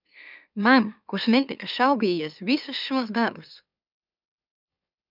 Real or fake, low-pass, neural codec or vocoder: fake; 5.4 kHz; autoencoder, 44.1 kHz, a latent of 192 numbers a frame, MeloTTS